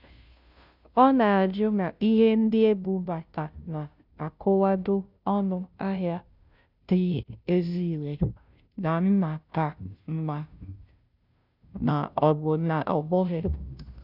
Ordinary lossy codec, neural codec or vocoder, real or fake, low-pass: none; codec, 16 kHz, 0.5 kbps, FunCodec, trained on Chinese and English, 25 frames a second; fake; 5.4 kHz